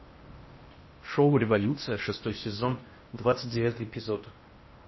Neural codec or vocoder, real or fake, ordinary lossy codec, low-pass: codec, 16 kHz in and 24 kHz out, 0.6 kbps, FocalCodec, streaming, 4096 codes; fake; MP3, 24 kbps; 7.2 kHz